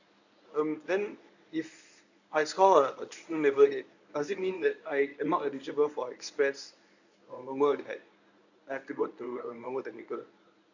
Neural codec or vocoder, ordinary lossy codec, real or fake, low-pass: codec, 24 kHz, 0.9 kbps, WavTokenizer, medium speech release version 1; none; fake; 7.2 kHz